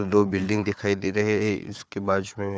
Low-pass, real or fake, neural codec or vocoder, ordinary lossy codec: none; fake; codec, 16 kHz, 4 kbps, FunCodec, trained on Chinese and English, 50 frames a second; none